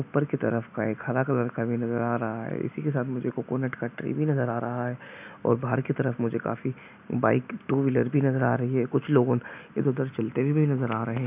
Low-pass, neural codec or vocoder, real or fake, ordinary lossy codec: 3.6 kHz; none; real; none